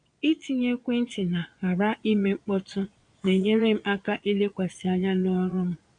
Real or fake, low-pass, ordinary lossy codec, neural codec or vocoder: fake; 9.9 kHz; none; vocoder, 22.05 kHz, 80 mel bands, Vocos